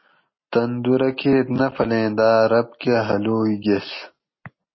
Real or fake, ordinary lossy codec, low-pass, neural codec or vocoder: real; MP3, 24 kbps; 7.2 kHz; none